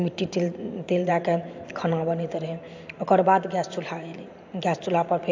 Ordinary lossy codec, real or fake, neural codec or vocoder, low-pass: AAC, 48 kbps; fake; vocoder, 44.1 kHz, 128 mel bands every 512 samples, BigVGAN v2; 7.2 kHz